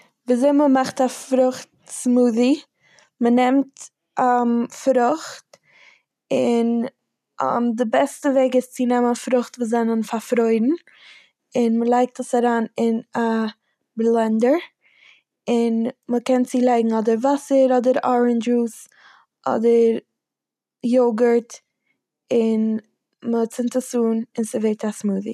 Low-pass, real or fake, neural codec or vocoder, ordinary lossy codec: 14.4 kHz; real; none; none